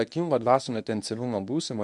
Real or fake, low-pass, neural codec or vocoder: fake; 10.8 kHz; codec, 24 kHz, 0.9 kbps, WavTokenizer, medium speech release version 1